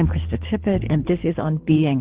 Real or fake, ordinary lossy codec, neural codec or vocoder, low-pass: fake; Opus, 16 kbps; codec, 24 kHz, 6 kbps, HILCodec; 3.6 kHz